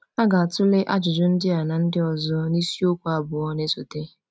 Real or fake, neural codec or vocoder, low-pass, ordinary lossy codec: real; none; none; none